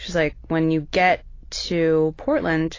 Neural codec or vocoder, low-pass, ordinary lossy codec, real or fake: none; 7.2 kHz; AAC, 32 kbps; real